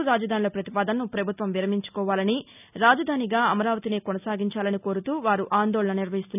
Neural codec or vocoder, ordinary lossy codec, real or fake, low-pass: none; none; real; 3.6 kHz